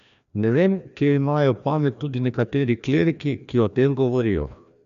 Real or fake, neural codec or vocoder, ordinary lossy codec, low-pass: fake; codec, 16 kHz, 1 kbps, FreqCodec, larger model; none; 7.2 kHz